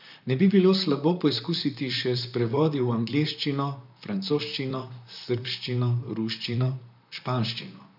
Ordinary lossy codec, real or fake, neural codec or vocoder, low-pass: none; fake; vocoder, 44.1 kHz, 128 mel bands, Pupu-Vocoder; 5.4 kHz